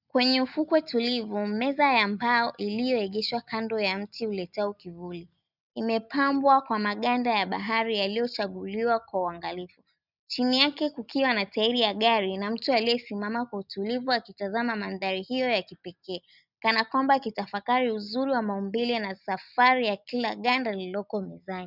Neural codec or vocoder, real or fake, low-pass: none; real; 5.4 kHz